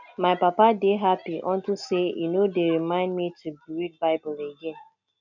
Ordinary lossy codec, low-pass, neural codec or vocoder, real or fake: none; 7.2 kHz; none; real